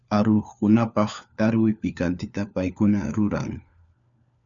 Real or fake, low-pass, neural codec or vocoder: fake; 7.2 kHz; codec, 16 kHz, 4 kbps, FunCodec, trained on LibriTTS, 50 frames a second